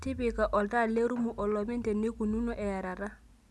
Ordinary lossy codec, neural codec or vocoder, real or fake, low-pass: none; none; real; none